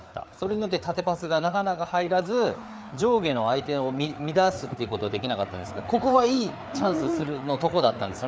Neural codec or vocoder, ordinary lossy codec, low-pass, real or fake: codec, 16 kHz, 4 kbps, FreqCodec, larger model; none; none; fake